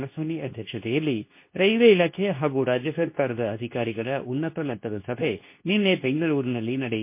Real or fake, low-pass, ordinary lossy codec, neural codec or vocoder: fake; 3.6 kHz; MP3, 24 kbps; codec, 24 kHz, 0.9 kbps, WavTokenizer, medium speech release version 2